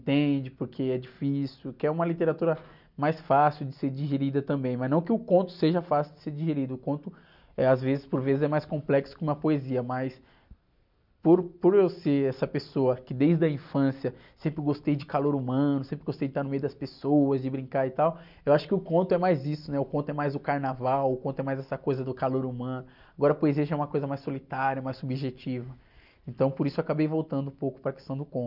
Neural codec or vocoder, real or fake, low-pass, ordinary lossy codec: none; real; 5.4 kHz; none